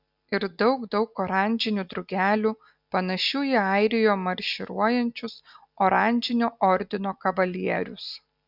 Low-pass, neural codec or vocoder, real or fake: 5.4 kHz; none; real